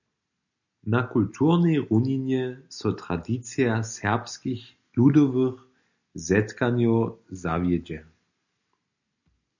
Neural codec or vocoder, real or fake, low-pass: none; real; 7.2 kHz